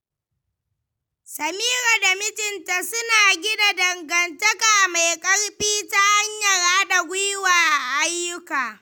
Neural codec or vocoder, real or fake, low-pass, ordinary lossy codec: none; real; none; none